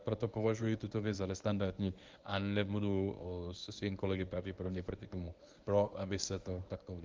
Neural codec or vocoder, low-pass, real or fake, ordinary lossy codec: codec, 24 kHz, 0.9 kbps, WavTokenizer, medium speech release version 1; 7.2 kHz; fake; Opus, 32 kbps